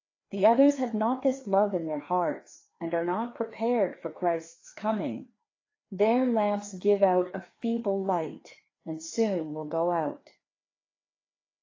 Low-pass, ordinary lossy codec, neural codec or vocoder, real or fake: 7.2 kHz; AAC, 32 kbps; codec, 16 kHz, 2 kbps, FreqCodec, larger model; fake